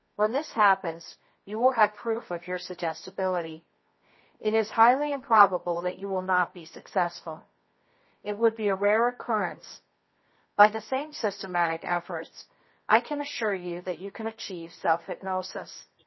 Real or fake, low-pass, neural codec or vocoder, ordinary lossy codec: fake; 7.2 kHz; codec, 24 kHz, 0.9 kbps, WavTokenizer, medium music audio release; MP3, 24 kbps